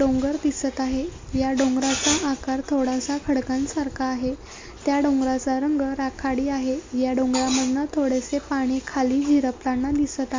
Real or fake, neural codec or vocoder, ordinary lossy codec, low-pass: real; none; MP3, 48 kbps; 7.2 kHz